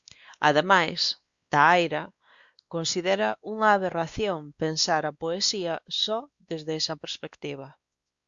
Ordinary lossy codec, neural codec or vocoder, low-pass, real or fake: Opus, 64 kbps; codec, 16 kHz, 2 kbps, X-Codec, WavLM features, trained on Multilingual LibriSpeech; 7.2 kHz; fake